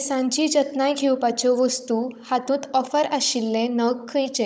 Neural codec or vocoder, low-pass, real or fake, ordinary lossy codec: codec, 16 kHz, 16 kbps, FunCodec, trained on Chinese and English, 50 frames a second; none; fake; none